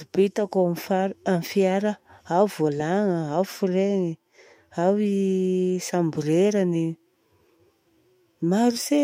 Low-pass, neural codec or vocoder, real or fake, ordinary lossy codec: 19.8 kHz; autoencoder, 48 kHz, 128 numbers a frame, DAC-VAE, trained on Japanese speech; fake; MP3, 64 kbps